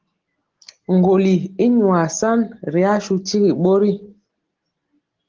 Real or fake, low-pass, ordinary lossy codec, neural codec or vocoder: real; 7.2 kHz; Opus, 16 kbps; none